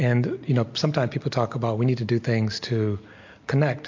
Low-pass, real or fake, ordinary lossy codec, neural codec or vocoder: 7.2 kHz; real; MP3, 48 kbps; none